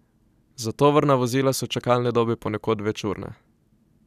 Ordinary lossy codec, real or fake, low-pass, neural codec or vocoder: none; real; 14.4 kHz; none